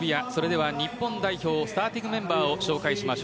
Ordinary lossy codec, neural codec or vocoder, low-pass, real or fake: none; none; none; real